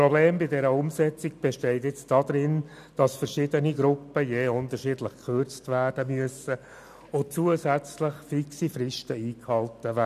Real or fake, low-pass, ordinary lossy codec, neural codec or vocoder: real; 14.4 kHz; none; none